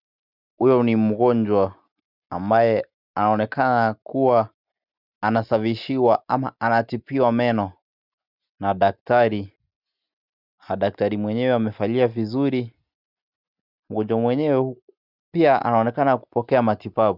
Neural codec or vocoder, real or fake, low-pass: none; real; 5.4 kHz